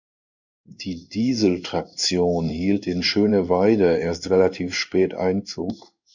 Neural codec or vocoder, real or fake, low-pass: codec, 16 kHz in and 24 kHz out, 1 kbps, XY-Tokenizer; fake; 7.2 kHz